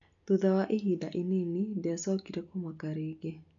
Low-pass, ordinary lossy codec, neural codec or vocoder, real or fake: 7.2 kHz; none; none; real